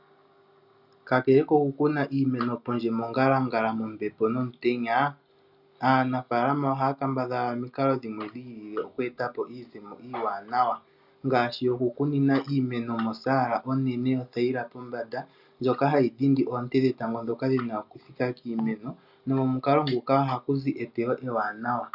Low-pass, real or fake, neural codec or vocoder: 5.4 kHz; real; none